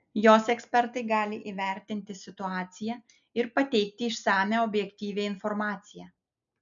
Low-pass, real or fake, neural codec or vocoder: 7.2 kHz; real; none